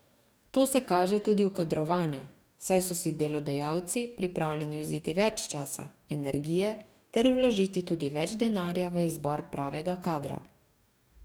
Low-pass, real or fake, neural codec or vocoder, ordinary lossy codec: none; fake; codec, 44.1 kHz, 2.6 kbps, DAC; none